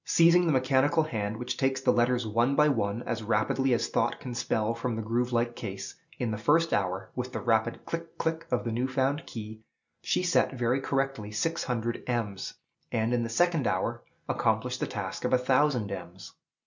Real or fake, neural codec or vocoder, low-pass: real; none; 7.2 kHz